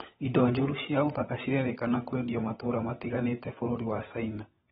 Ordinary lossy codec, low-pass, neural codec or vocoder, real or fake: AAC, 16 kbps; 7.2 kHz; codec, 16 kHz, 16 kbps, FunCodec, trained on LibriTTS, 50 frames a second; fake